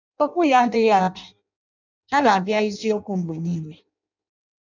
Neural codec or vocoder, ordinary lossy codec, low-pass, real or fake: codec, 16 kHz in and 24 kHz out, 0.6 kbps, FireRedTTS-2 codec; none; 7.2 kHz; fake